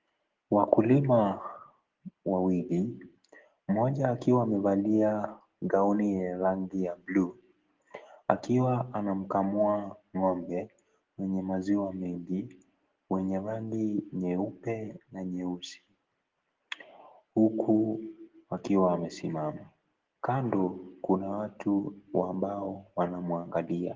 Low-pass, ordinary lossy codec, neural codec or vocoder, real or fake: 7.2 kHz; Opus, 16 kbps; none; real